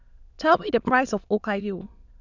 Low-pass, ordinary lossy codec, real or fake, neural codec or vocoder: 7.2 kHz; none; fake; autoencoder, 22.05 kHz, a latent of 192 numbers a frame, VITS, trained on many speakers